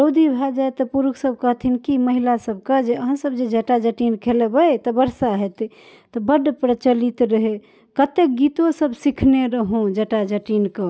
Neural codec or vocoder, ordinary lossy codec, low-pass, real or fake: none; none; none; real